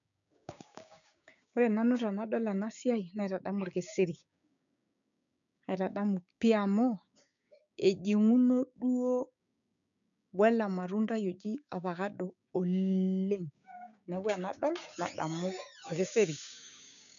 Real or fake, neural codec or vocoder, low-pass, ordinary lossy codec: fake; codec, 16 kHz, 6 kbps, DAC; 7.2 kHz; none